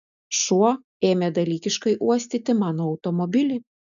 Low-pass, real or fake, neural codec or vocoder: 7.2 kHz; real; none